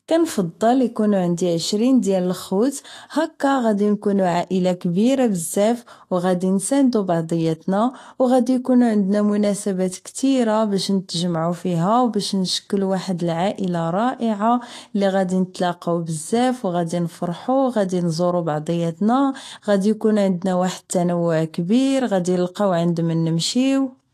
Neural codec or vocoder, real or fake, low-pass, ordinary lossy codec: autoencoder, 48 kHz, 128 numbers a frame, DAC-VAE, trained on Japanese speech; fake; 14.4 kHz; AAC, 48 kbps